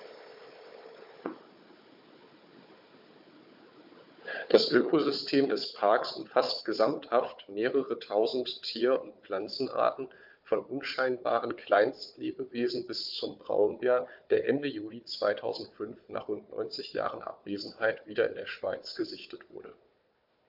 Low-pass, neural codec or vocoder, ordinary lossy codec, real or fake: 5.4 kHz; codec, 16 kHz, 4 kbps, FunCodec, trained on Chinese and English, 50 frames a second; MP3, 48 kbps; fake